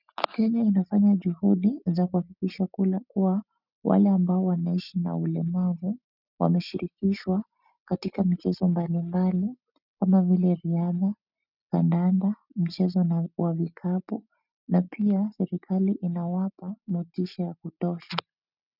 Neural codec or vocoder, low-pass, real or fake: none; 5.4 kHz; real